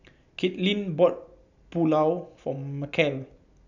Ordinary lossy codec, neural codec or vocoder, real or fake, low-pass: none; none; real; 7.2 kHz